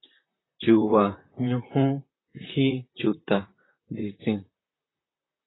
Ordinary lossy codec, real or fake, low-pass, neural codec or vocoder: AAC, 16 kbps; fake; 7.2 kHz; vocoder, 44.1 kHz, 128 mel bands, Pupu-Vocoder